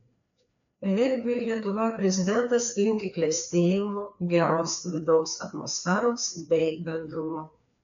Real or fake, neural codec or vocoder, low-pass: fake; codec, 16 kHz, 2 kbps, FreqCodec, larger model; 7.2 kHz